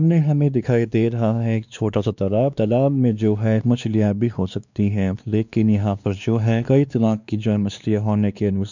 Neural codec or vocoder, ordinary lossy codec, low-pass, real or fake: codec, 16 kHz, 2 kbps, X-Codec, WavLM features, trained on Multilingual LibriSpeech; none; 7.2 kHz; fake